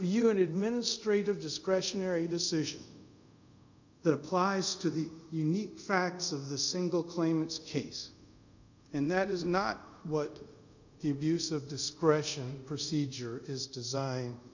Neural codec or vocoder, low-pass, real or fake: codec, 24 kHz, 0.5 kbps, DualCodec; 7.2 kHz; fake